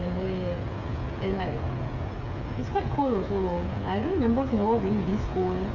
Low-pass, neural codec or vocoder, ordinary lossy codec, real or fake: 7.2 kHz; codec, 16 kHz, 8 kbps, FreqCodec, smaller model; none; fake